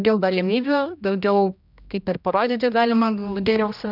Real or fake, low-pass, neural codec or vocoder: fake; 5.4 kHz; codec, 16 kHz, 1 kbps, X-Codec, HuBERT features, trained on general audio